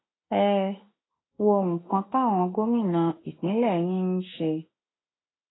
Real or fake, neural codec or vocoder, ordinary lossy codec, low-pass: fake; autoencoder, 48 kHz, 32 numbers a frame, DAC-VAE, trained on Japanese speech; AAC, 16 kbps; 7.2 kHz